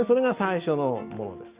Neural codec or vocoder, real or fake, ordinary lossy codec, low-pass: none; real; Opus, 64 kbps; 3.6 kHz